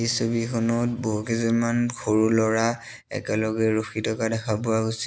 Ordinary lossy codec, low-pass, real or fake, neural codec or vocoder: none; none; real; none